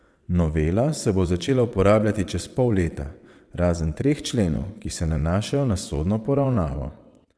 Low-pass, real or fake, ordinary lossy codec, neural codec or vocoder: none; fake; none; vocoder, 22.05 kHz, 80 mel bands, WaveNeXt